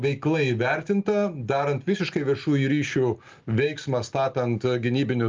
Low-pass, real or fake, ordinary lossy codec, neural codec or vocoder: 7.2 kHz; real; Opus, 24 kbps; none